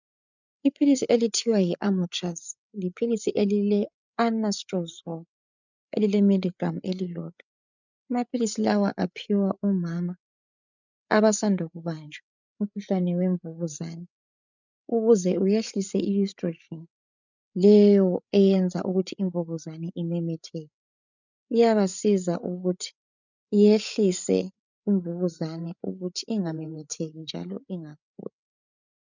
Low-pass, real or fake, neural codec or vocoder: 7.2 kHz; fake; codec, 16 kHz, 8 kbps, FreqCodec, larger model